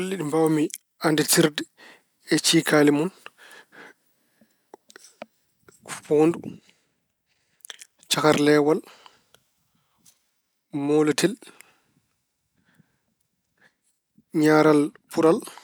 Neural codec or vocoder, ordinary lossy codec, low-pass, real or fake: none; none; none; real